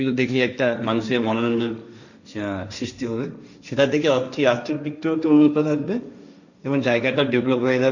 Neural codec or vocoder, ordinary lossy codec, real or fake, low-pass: codec, 16 kHz, 1.1 kbps, Voila-Tokenizer; none; fake; 7.2 kHz